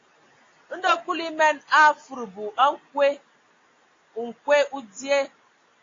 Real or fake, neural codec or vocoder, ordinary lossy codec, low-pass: real; none; AAC, 32 kbps; 7.2 kHz